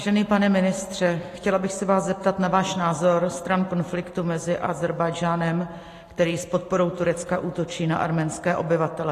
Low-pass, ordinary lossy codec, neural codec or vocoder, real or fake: 14.4 kHz; AAC, 48 kbps; none; real